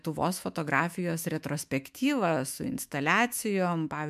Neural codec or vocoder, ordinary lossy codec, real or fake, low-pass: autoencoder, 48 kHz, 128 numbers a frame, DAC-VAE, trained on Japanese speech; MP3, 96 kbps; fake; 14.4 kHz